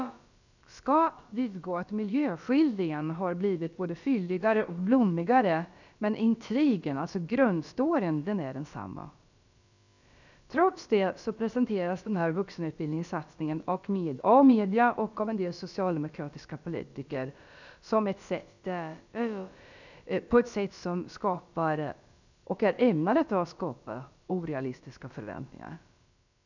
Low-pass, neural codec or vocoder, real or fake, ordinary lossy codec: 7.2 kHz; codec, 16 kHz, about 1 kbps, DyCAST, with the encoder's durations; fake; none